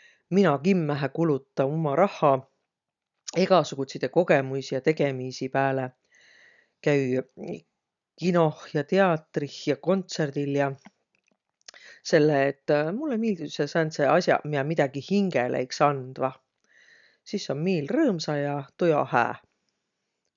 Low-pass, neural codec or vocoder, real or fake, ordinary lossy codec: 7.2 kHz; none; real; none